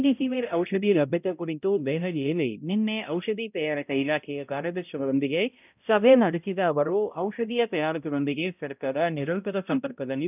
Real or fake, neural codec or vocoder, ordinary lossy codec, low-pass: fake; codec, 16 kHz, 0.5 kbps, X-Codec, HuBERT features, trained on balanced general audio; none; 3.6 kHz